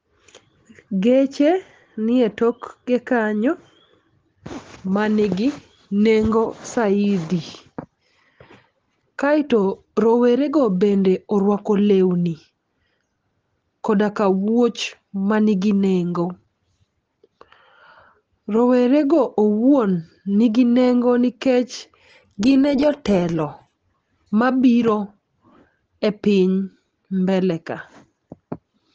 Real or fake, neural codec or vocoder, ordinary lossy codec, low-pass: real; none; Opus, 16 kbps; 7.2 kHz